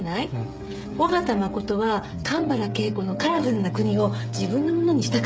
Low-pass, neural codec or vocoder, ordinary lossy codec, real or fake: none; codec, 16 kHz, 16 kbps, FreqCodec, smaller model; none; fake